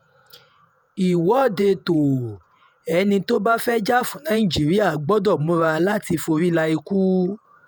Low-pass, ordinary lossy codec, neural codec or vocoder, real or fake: none; none; vocoder, 48 kHz, 128 mel bands, Vocos; fake